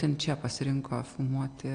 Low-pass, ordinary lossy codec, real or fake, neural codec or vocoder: 9.9 kHz; AAC, 48 kbps; real; none